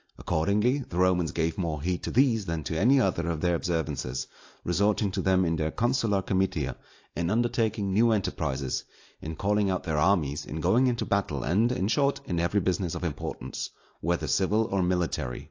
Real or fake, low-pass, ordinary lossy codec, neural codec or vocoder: real; 7.2 kHz; AAC, 48 kbps; none